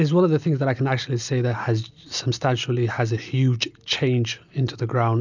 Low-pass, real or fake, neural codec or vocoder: 7.2 kHz; real; none